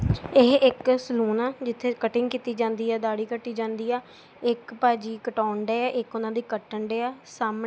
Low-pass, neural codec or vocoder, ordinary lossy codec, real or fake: none; none; none; real